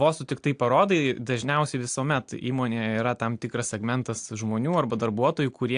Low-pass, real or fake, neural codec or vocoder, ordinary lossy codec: 9.9 kHz; real; none; AAC, 64 kbps